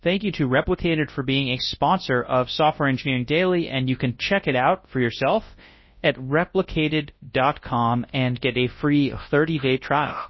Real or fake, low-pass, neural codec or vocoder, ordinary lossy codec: fake; 7.2 kHz; codec, 24 kHz, 0.9 kbps, WavTokenizer, large speech release; MP3, 24 kbps